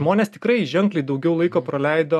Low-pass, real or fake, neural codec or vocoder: 14.4 kHz; real; none